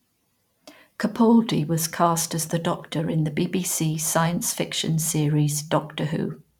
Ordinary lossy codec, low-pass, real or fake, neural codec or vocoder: none; 19.8 kHz; fake; vocoder, 44.1 kHz, 128 mel bands every 512 samples, BigVGAN v2